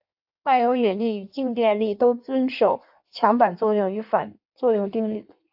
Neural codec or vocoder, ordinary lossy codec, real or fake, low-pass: codec, 16 kHz in and 24 kHz out, 1.1 kbps, FireRedTTS-2 codec; AAC, 48 kbps; fake; 5.4 kHz